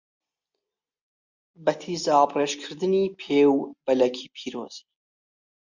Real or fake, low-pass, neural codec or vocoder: real; 7.2 kHz; none